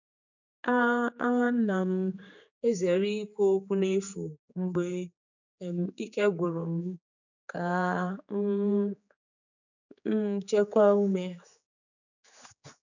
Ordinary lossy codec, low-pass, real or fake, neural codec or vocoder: none; 7.2 kHz; fake; codec, 16 kHz, 4 kbps, X-Codec, HuBERT features, trained on general audio